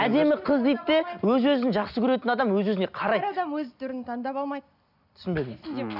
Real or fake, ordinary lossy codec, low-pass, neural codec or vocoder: real; none; 5.4 kHz; none